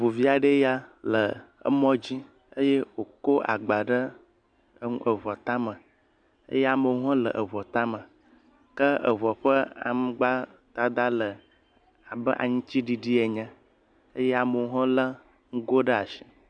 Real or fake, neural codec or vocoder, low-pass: real; none; 9.9 kHz